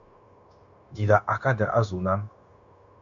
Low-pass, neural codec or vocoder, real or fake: 7.2 kHz; codec, 16 kHz, 0.9 kbps, LongCat-Audio-Codec; fake